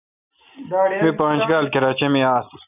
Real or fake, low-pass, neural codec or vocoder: real; 3.6 kHz; none